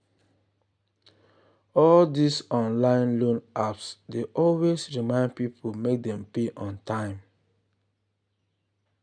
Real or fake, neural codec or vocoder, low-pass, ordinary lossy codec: real; none; 9.9 kHz; none